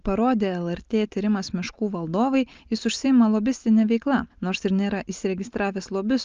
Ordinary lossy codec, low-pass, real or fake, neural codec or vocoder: Opus, 32 kbps; 7.2 kHz; real; none